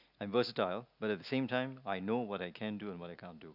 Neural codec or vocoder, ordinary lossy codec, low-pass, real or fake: none; none; 5.4 kHz; real